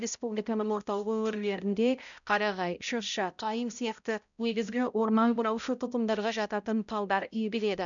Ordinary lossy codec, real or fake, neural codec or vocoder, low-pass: none; fake; codec, 16 kHz, 0.5 kbps, X-Codec, HuBERT features, trained on balanced general audio; 7.2 kHz